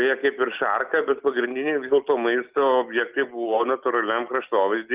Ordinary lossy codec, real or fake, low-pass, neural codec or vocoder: Opus, 24 kbps; real; 3.6 kHz; none